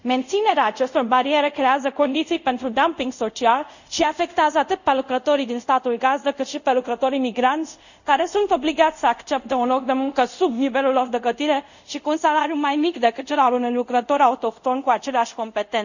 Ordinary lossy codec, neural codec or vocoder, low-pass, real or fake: none; codec, 24 kHz, 0.5 kbps, DualCodec; 7.2 kHz; fake